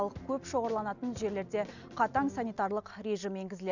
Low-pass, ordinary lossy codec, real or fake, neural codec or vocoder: 7.2 kHz; none; real; none